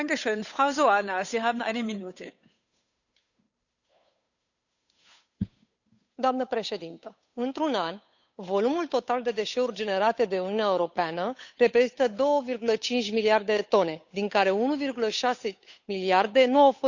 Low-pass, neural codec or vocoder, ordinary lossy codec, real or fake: 7.2 kHz; codec, 16 kHz, 8 kbps, FunCodec, trained on Chinese and English, 25 frames a second; none; fake